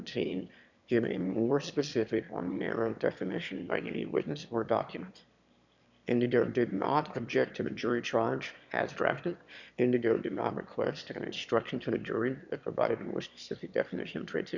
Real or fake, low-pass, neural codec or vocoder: fake; 7.2 kHz; autoencoder, 22.05 kHz, a latent of 192 numbers a frame, VITS, trained on one speaker